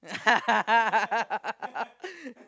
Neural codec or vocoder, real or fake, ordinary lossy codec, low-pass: none; real; none; none